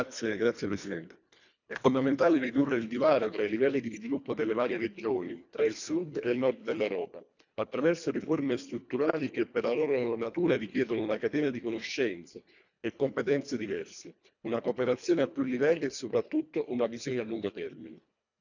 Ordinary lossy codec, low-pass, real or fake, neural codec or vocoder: none; 7.2 kHz; fake; codec, 24 kHz, 1.5 kbps, HILCodec